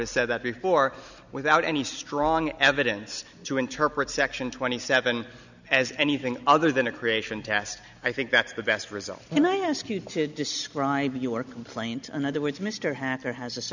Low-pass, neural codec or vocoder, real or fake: 7.2 kHz; none; real